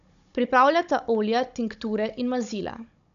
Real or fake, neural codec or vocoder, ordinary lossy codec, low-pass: fake; codec, 16 kHz, 16 kbps, FunCodec, trained on Chinese and English, 50 frames a second; none; 7.2 kHz